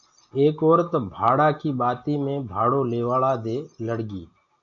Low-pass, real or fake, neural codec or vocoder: 7.2 kHz; real; none